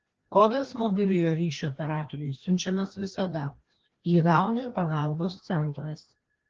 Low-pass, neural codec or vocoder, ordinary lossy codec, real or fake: 7.2 kHz; codec, 16 kHz, 1 kbps, FreqCodec, larger model; Opus, 16 kbps; fake